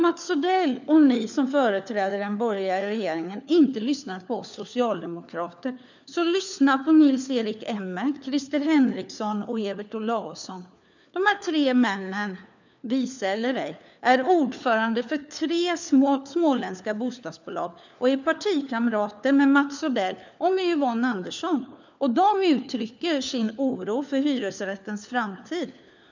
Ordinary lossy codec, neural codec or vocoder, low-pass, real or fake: none; codec, 16 kHz, 4 kbps, FunCodec, trained on LibriTTS, 50 frames a second; 7.2 kHz; fake